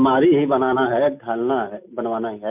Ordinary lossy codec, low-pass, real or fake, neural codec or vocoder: MP3, 32 kbps; 3.6 kHz; real; none